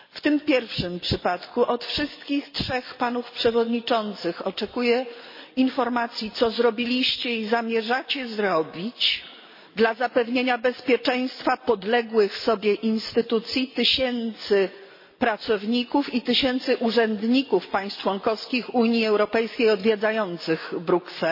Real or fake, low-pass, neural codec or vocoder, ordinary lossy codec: real; 5.4 kHz; none; MP3, 24 kbps